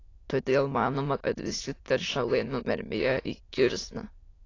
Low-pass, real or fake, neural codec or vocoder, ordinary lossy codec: 7.2 kHz; fake; autoencoder, 22.05 kHz, a latent of 192 numbers a frame, VITS, trained on many speakers; AAC, 32 kbps